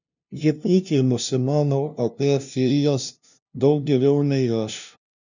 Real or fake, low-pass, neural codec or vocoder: fake; 7.2 kHz; codec, 16 kHz, 0.5 kbps, FunCodec, trained on LibriTTS, 25 frames a second